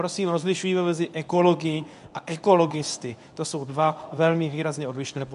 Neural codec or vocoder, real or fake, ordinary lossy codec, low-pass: codec, 24 kHz, 0.9 kbps, WavTokenizer, medium speech release version 1; fake; AAC, 96 kbps; 10.8 kHz